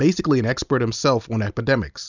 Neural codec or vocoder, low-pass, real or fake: none; 7.2 kHz; real